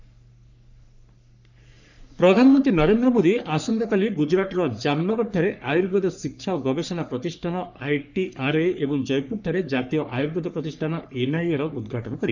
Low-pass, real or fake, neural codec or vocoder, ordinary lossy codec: 7.2 kHz; fake; codec, 44.1 kHz, 3.4 kbps, Pupu-Codec; none